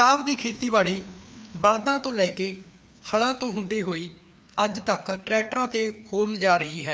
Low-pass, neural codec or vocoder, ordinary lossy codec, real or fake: none; codec, 16 kHz, 2 kbps, FreqCodec, larger model; none; fake